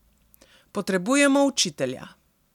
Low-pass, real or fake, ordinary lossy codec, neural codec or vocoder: 19.8 kHz; real; none; none